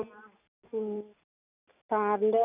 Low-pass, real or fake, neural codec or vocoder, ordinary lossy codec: 3.6 kHz; real; none; none